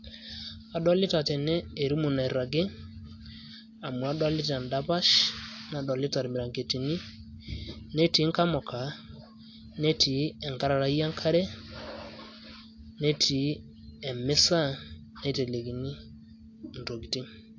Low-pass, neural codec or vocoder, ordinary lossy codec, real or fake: 7.2 kHz; none; AAC, 48 kbps; real